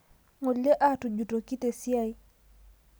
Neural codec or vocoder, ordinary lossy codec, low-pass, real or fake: none; none; none; real